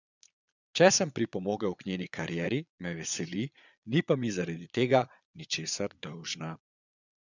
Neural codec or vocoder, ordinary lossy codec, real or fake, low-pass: vocoder, 22.05 kHz, 80 mel bands, Vocos; none; fake; 7.2 kHz